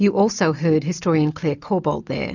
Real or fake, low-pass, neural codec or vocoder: real; 7.2 kHz; none